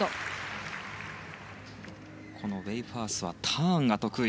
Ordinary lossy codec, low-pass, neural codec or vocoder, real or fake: none; none; none; real